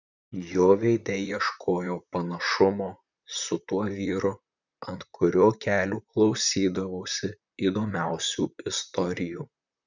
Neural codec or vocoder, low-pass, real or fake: vocoder, 44.1 kHz, 128 mel bands, Pupu-Vocoder; 7.2 kHz; fake